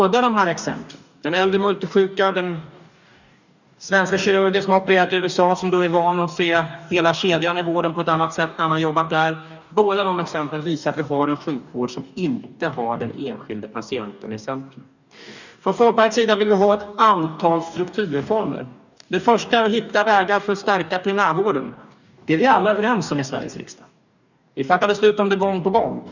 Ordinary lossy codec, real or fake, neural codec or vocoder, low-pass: none; fake; codec, 44.1 kHz, 2.6 kbps, DAC; 7.2 kHz